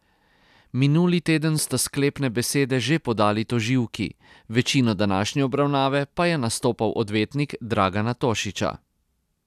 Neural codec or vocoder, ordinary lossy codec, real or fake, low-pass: none; none; real; 14.4 kHz